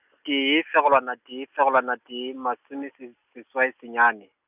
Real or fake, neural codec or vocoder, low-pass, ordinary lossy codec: real; none; 3.6 kHz; none